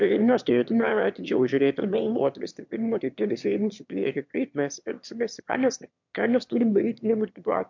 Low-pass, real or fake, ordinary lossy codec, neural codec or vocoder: 7.2 kHz; fake; MP3, 64 kbps; autoencoder, 22.05 kHz, a latent of 192 numbers a frame, VITS, trained on one speaker